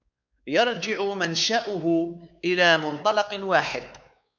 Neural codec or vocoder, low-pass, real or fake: codec, 16 kHz, 4 kbps, X-Codec, WavLM features, trained on Multilingual LibriSpeech; 7.2 kHz; fake